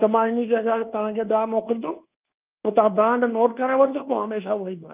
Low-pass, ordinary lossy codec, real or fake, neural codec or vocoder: 3.6 kHz; Opus, 24 kbps; fake; codec, 24 kHz, 1.2 kbps, DualCodec